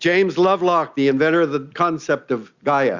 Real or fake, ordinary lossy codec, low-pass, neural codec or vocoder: real; Opus, 64 kbps; 7.2 kHz; none